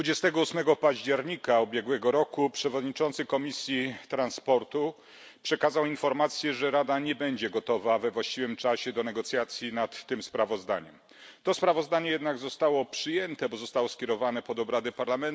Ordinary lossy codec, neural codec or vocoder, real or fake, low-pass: none; none; real; none